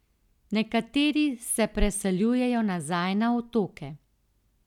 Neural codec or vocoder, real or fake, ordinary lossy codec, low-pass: none; real; none; 19.8 kHz